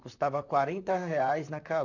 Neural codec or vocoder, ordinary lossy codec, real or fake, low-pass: vocoder, 44.1 kHz, 128 mel bands, Pupu-Vocoder; none; fake; 7.2 kHz